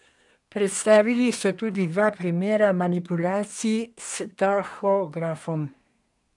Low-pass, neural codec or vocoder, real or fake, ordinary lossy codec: 10.8 kHz; codec, 24 kHz, 1 kbps, SNAC; fake; none